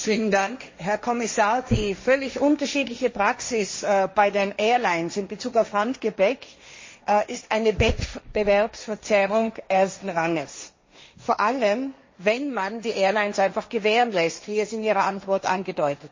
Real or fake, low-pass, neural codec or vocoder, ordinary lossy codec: fake; 7.2 kHz; codec, 16 kHz, 1.1 kbps, Voila-Tokenizer; MP3, 32 kbps